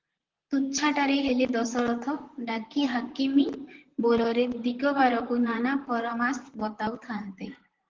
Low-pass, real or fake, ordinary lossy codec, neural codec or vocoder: 7.2 kHz; fake; Opus, 16 kbps; vocoder, 44.1 kHz, 128 mel bands, Pupu-Vocoder